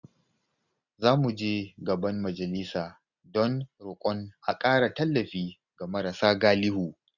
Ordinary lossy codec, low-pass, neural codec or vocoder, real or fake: none; 7.2 kHz; none; real